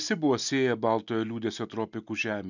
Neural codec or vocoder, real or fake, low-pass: none; real; 7.2 kHz